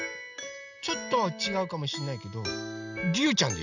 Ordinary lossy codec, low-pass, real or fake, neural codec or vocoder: none; 7.2 kHz; real; none